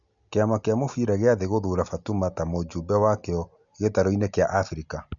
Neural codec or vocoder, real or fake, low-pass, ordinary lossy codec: none; real; 7.2 kHz; none